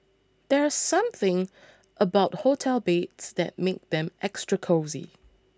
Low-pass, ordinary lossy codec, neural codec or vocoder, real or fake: none; none; none; real